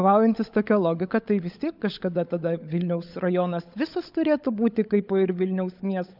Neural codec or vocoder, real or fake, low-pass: codec, 16 kHz, 16 kbps, FunCodec, trained on LibriTTS, 50 frames a second; fake; 5.4 kHz